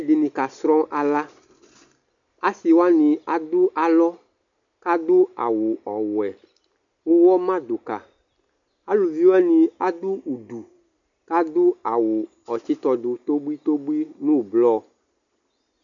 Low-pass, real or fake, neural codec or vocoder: 7.2 kHz; real; none